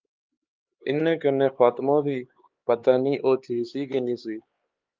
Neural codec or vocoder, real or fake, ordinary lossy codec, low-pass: codec, 16 kHz, 4 kbps, X-Codec, HuBERT features, trained on LibriSpeech; fake; Opus, 32 kbps; 7.2 kHz